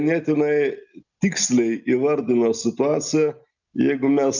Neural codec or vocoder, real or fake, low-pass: none; real; 7.2 kHz